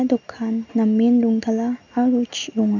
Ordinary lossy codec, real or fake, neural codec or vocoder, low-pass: none; real; none; 7.2 kHz